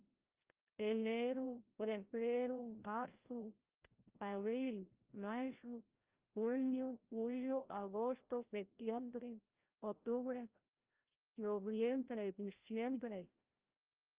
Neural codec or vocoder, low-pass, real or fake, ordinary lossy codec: codec, 16 kHz, 0.5 kbps, FreqCodec, larger model; 3.6 kHz; fake; Opus, 32 kbps